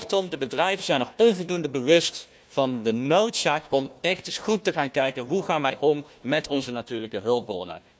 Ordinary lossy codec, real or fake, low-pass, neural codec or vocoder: none; fake; none; codec, 16 kHz, 1 kbps, FunCodec, trained on Chinese and English, 50 frames a second